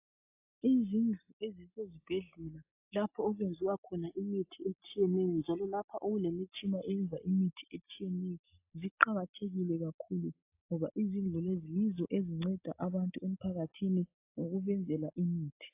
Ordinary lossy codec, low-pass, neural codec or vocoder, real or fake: AAC, 32 kbps; 3.6 kHz; none; real